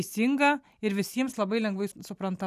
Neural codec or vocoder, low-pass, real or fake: none; 14.4 kHz; real